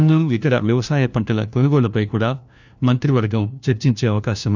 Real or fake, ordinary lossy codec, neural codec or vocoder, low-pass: fake; none; codec, 16 kHz, 1 kbps, FunCodec, trained on LibriTTS, 50 frames a second; 7.2 kHz